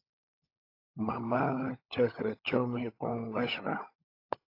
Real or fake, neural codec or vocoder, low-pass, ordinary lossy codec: fake; codec, 16 kHz, 16 kbps, FunCodec, trained on LibriTTS, 50 frames a second; 5.4 kHz; AAC, 32 kbps